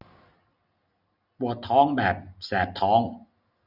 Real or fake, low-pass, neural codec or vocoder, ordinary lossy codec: real; 5.4 kHz; none; none